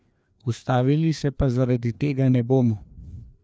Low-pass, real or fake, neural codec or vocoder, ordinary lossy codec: none; fake; codec, 16 kHz, 2 kbps, FreqCodec, larger model; none